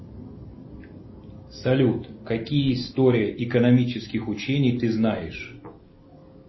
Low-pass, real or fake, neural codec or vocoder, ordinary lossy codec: 7.2 kHz; real; none; MP3, 24 kbps